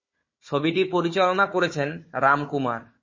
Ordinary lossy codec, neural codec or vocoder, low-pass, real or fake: MP3, 32 kbps; codec, 16 kHz, 4 kbps, FunCodec, trained on Chinese and English, 50 frames a second; 7.2 kHz; fake